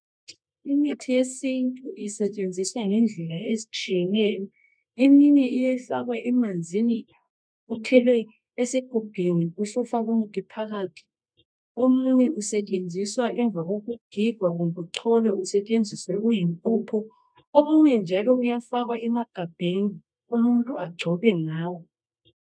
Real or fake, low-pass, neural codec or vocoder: fake; 9.9 kHz; codec, 24 kHz, 0.9 kbps, WavTokenizer, medium music audio release